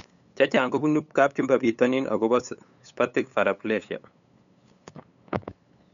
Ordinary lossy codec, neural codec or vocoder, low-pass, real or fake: MP3, 96 kbps; codec, 16 kHz, 8 kbps, FunCodec, trained on LibriTTS, 25 frames a second; 7.2 kHz; fake